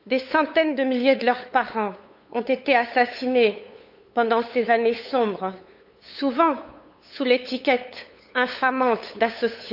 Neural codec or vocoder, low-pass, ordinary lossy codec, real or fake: codec, 16 kHz, 8 kbps, FunCodec, trained on LibriTTS, 25 frames a second; 5.4 kHz; AAC, 48 kbps; fake